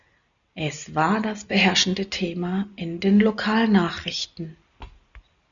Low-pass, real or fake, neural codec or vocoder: 7.2 kHz; real; none